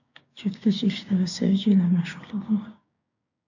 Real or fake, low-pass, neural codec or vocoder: fake; 7.2 kHz; codec, 16 kHz, 6 kbps, DAC